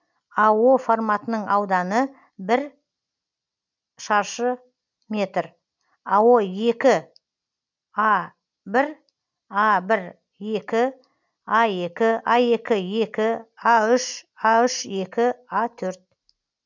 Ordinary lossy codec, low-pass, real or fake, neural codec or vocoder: none; 7.2 kHz; real; none